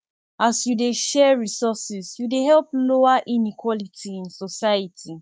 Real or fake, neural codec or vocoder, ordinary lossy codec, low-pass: fake; codec, 16 kHz, 6 kbps, DAC; none; none